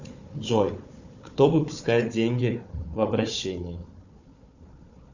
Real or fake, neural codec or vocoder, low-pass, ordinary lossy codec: fake; codec, 16 kHz, 4 kbps, FunCodec, trained on Chinese and English, 50 frames a second; 7.2 kHz; Opus, 64 kbps